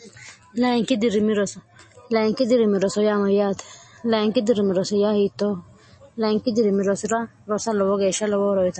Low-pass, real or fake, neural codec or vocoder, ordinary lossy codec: 9.9 kHz; real; none; MP3, 32 kbps